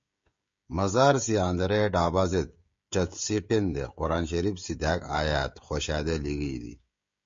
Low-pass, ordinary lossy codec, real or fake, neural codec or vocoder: 7.2 kHz; MP3, 48 kbps; fake; codec, 16 kHz, 16 kbps, FreqCodec, smaller model